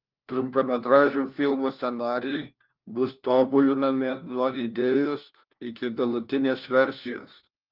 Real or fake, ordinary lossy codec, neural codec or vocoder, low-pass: fake; Opus, 32 kbps; codec, 16 kHz, 1 kbps, FunCodec, trained on LibriTTS, 50 frames a second; 5.4 kHz